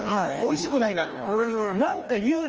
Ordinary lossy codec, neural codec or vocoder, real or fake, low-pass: Opus, 24 kbps; codec, 16 kHz, 1 kbps, FreqCodec, larger model; fake; 7.2 kHz